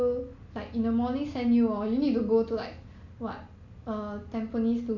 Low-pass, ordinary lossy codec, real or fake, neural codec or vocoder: 7.2 kHz; none; real; none